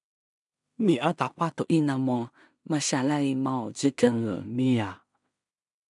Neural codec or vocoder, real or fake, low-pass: codec, 16 kHz in and 24 kHz out, 0.4 kbps, LongCat-Audio-Codec, two codebook decoder; fake; 10.8 kHz